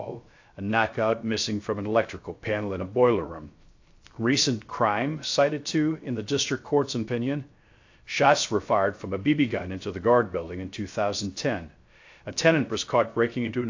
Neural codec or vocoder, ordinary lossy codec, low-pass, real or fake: codec, 16 kHz, 0.3 kbps, FocalCodec; AAC, 48 kbps; 7.2 kHz; fake